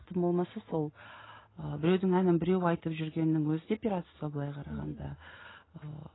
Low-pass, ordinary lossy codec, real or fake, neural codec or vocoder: 7.2 kHz; AAC, 16 kbps; real; none